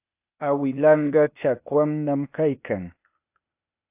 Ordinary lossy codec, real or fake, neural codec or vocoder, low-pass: AAC, 32 kbps; fake; codec, 16 kHz, 0.8 kbps, ZipCodec; 3.6 kHz